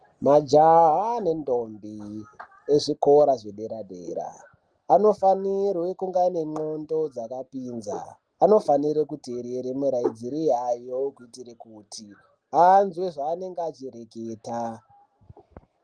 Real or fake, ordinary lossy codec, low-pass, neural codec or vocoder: real; Opus, 24 kbps; 9.9 kHz; none